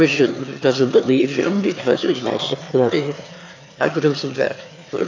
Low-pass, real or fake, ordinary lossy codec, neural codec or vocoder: 7.2 kHz; fake; AAC, 48 kbps; autoencoder, 22.05 kHz, a latent of 192 numbers a frame, VITS, trained on one speaker